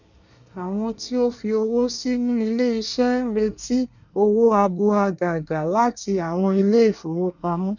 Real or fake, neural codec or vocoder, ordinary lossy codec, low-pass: fake; codec, 24 kHz, 1 kbps, SNAC; none; 7.2 kHz